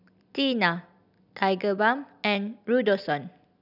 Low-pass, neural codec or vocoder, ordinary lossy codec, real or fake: 5.4 kHz; none; none; real